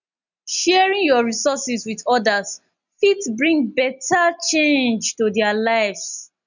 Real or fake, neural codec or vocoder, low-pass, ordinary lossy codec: real; none; 7.2 kHz; none